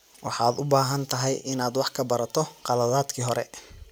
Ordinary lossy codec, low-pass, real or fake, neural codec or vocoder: none; none; real; none